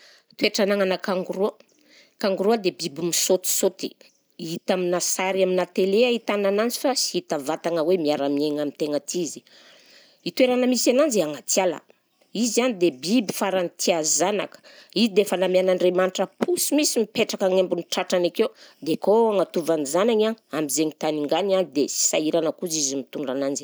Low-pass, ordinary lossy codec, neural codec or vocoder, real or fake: none; none; none; real